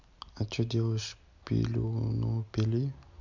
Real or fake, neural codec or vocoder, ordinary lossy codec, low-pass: real; none; MP3, 64 kbps; 7.2 kHz